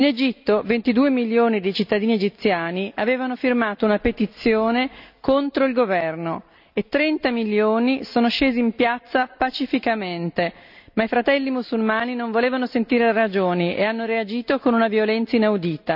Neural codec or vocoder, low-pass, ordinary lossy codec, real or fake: none; 5.4 kHz; none; real